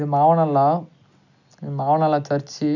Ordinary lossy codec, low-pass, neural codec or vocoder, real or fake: none; 7.2 kHz; none; real